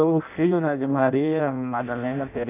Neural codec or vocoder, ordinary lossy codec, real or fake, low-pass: codec, 16 kHz in and 24 kHz out, 0.6 kbps, FireRedTTS-2 codec; none; fake; 3.6 kHz